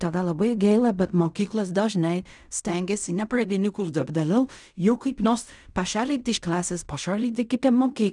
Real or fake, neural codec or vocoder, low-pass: fake; codec, 16 kHz in and 24 kHz out, 0.4 kbps, LongCat-Audio-Codec, fine tuned four codebook decoder; 10.8 kHz